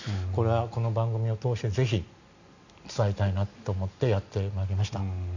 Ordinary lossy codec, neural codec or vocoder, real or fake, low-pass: none; none; real; 7.2 kHz